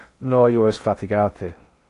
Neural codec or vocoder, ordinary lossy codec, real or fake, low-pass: codec, 16 kHz in and 24 kHz out, 0.8 kbps, FocalCodec, streaming, 65536 codes; AAC, 48 kbps; fake; 10.8 kHz